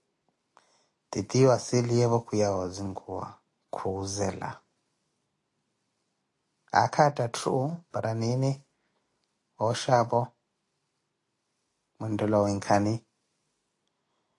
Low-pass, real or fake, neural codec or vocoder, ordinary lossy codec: 10.8 kHz; real; none; AAC, 48 kbps